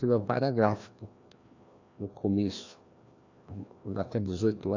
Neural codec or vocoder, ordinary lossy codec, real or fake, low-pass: codec, 16 kHz, 1 kbps, FreqCodec, larger model; AAC, 48 kbps; fake; 7.2 kHz